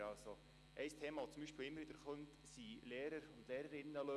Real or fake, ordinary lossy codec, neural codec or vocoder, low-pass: real; none; none; none